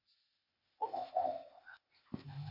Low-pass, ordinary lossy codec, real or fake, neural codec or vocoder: 5.4 kHz; Opus, 64 kbps; fake; codec, 16 kHz, 0.8 kbps, ZipCodec